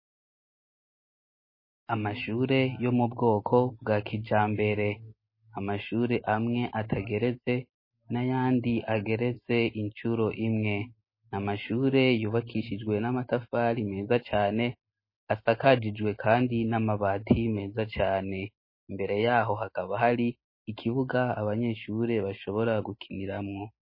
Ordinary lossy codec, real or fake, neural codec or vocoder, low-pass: MP3, 24 kbps; real; none; 5.4 kHz